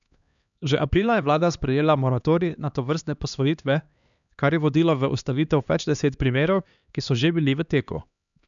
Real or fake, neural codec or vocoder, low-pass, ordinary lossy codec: fake; codec, 16 kHz, 2 kbps, X-Codec, HuBERT features, trained on LibriSpeech; 7.2 kHz; none